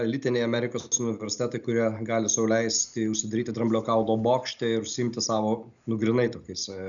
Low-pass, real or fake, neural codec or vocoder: 7.2 kHz; real; none